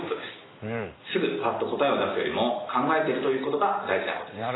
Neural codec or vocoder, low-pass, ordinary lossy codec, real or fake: none; 7.2 kHz; AAC, 16 kbps; real